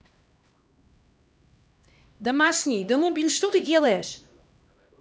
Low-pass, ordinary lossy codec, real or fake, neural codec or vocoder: none; none; fake; codec, 16 kHz, 1 kbps, X-Codec, HuBERT features, trained on LibriSpeech